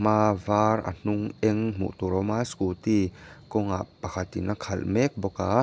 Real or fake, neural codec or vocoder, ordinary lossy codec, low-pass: real; none; none; none